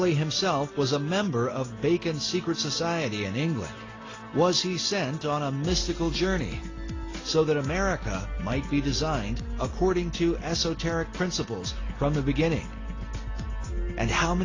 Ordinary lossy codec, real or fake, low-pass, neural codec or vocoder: AAC, 32 kbps; real; 7.2 kHz; none